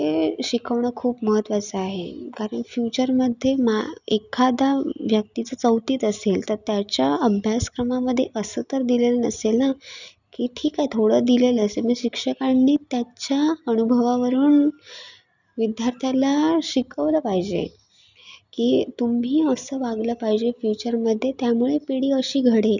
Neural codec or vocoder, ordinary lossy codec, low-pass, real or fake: none; none; 7.2 kHz; real